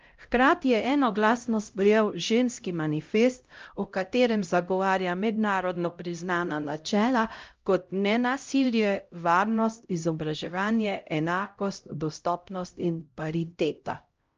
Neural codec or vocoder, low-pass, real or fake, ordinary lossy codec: codec, 16 kHz, 0.5 kbps, X-Codec, HuBERT features, trained on LibriSpeech; 7.2 kHz; fake; Opus, 24 kbps